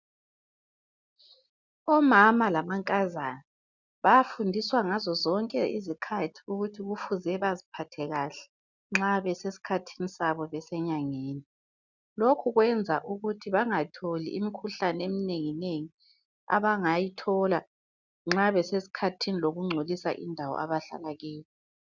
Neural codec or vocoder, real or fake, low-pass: none; real; 7.2 kHz